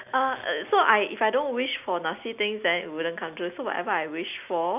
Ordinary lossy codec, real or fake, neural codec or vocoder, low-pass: none; real; none; 3.6 kHz